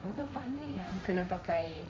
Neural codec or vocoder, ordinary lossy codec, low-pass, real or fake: codec, 16 kHz, 1.1 kbps, Voila-Tokenizer; none; none; fake